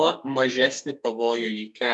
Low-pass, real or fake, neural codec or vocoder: 10.8 kHz; fake; codec, 32 kHz, 1.9 kbps, SNAC